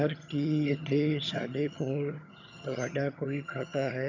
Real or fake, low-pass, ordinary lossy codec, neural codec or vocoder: fake; 7.2 kHz; none; vocoder, 22.05 kHz, 80 mel bands, HiFi-GAN